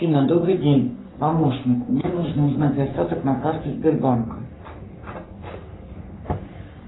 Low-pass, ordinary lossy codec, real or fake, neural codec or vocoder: 7.2 kHz; AAC, 16 kbps; fake; codec, 44.1 kHz, 3.4 kbps, Pupu-Codec